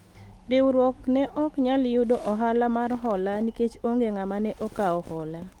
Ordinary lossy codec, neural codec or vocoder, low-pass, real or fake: Opus, 24 kbps; autoencoder, 48 kHz, 128 numbers a frame, DAC-VAE, trained on Japanese speech; 19.8 kHz; fake